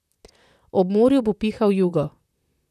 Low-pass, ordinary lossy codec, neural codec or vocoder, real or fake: 14.4 kHz; none; vocoder, 44.1 kHz, 128 mel bands, Pupu-Vocoder; fake